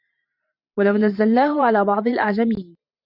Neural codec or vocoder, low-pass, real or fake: vocoder, 44.1 kHz, 128 mel bands every 512 samples, BigVGAN v2; 5.4 kHz; fake